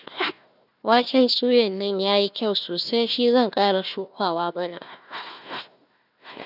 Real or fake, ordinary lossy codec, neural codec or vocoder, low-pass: fake; none; codec, 16 kHz, 1 kbps, FunCodec, trained on Chinese and English, 50 frames a second; 5.4 kHz